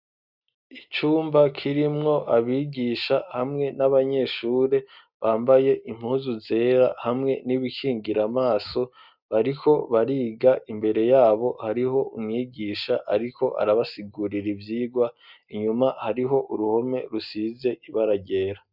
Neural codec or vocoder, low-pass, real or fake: none; 5.4 kHz; real